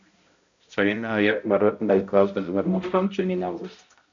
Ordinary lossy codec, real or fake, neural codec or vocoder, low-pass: AAC, 64 kbps; fake; codec, 16 kHz, 0.5 kbps, X-Codec, HuBERT features, trained on balanced general audio; 7.2 kHz